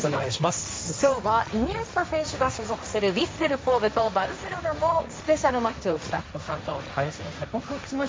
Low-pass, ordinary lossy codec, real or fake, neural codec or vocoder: none; none; fake; codec, 16 kHz, 1.1 kbps, Voila-Tokenizer